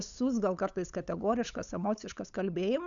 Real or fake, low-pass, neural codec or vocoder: fake; 7.2 kHz; codec, 16 kHz, 8 kbps, FunCodec, trained on LibriTTS, 25 frames a second